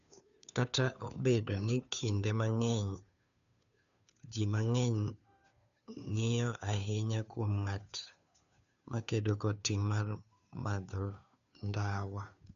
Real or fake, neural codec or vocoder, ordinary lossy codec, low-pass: fake; codec, 16 kHz, 2 kbps, FunCodec, trained on Chinese and English, 25 frames a second; none; 7.2 kHz